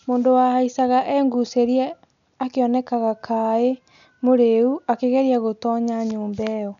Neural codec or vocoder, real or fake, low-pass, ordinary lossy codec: none; real; 7.2 kHz; none